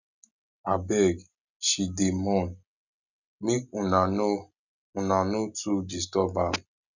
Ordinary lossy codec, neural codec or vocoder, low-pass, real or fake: none; none; 7.2 kHz; real